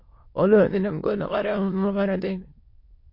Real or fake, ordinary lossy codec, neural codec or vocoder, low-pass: fake; MP3, 32 kbps; autoencoder, 22.05 kHz, a latent of 192 numbers a frame, VITS, trained on many speakers; 5.4 kHz